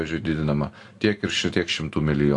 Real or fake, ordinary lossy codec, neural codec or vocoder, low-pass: real; AAC, 48 kbps; none; 10.8 kHz